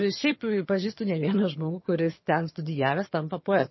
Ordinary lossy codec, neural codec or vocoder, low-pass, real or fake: MP3, 24 kbps; vocoder, 22.05 kHz, 80 mel bands, HiFi-GAN; 7.2 kHz; fake